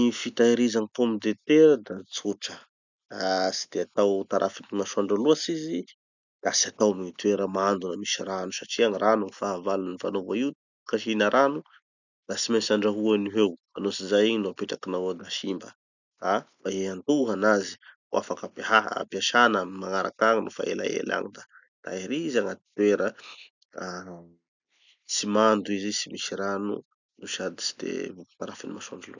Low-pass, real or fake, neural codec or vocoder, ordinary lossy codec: 7.2 kHz; real; none; none